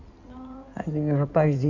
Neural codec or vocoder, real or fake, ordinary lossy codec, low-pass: codec, 16 kHz in and 24 kHz out, 2.2 kbps, FireRedTTS-2 codec; fake; none; 7.2 kHz